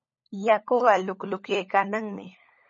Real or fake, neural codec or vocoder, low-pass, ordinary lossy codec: fake; codec, 16 kHz, 16 kbps, FunCodec, trained on LibriTTS, 50 frames a second; 7.2 kHz; MP3, 32 kbps